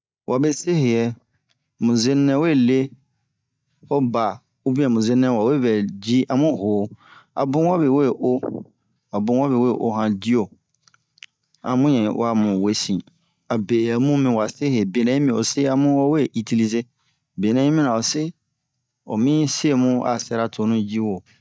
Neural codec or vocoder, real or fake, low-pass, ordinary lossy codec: none; real; none; none